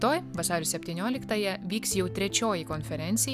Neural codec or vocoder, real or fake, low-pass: none; real; 14.4 kHz